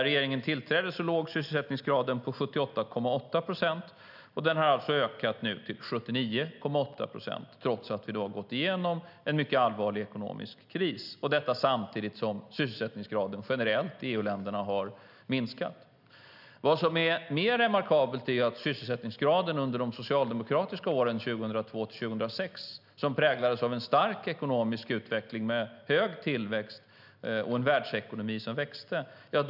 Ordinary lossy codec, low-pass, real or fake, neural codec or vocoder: none; 5.4 kHz; real; none